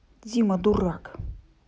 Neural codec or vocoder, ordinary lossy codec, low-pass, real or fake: none; none; none; real